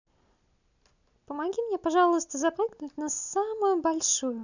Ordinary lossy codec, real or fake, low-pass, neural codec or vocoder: none; real; 7.2 kHz; none